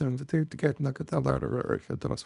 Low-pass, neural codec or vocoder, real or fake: 10.8 kHz; codec, 24 kHz, 0.9 kbps, WavTokenizer, small release; fake